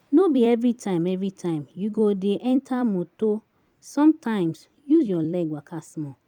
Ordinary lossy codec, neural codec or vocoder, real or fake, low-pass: none; vocoder, 44.1 kHz, 128 mel bands every 256 samples, BigVGAN v2; fake; 19.8 kHz